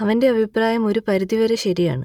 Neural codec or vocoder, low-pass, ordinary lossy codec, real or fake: none; 19.8 kHz; none; real